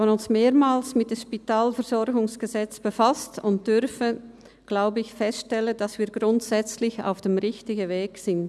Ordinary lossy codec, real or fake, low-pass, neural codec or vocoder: none; real; none; none